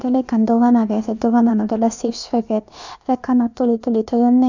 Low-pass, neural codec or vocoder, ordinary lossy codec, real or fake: 7.2 kHz; codec, 16 kHz, about 1 kbps, DyCAST, with the encoder's durations; none; fake